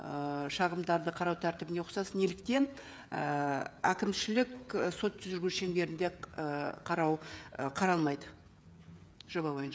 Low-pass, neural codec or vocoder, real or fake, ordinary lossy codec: none; codec, 16 kHz, 16 kbps, FreqCodec, smaller model; fake; none